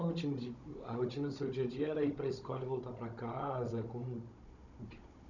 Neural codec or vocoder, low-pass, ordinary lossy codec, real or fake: codec, 16 kHz, 16 kbps, FunCodec, trained on Chinese and English, 50 frames a second; 7.2 kHz; none; fake